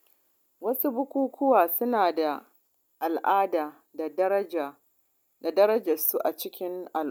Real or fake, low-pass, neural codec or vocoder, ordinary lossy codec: real; none; none; none